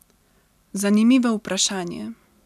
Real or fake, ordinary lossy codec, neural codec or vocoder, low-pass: real; none; none; 14.4 kHz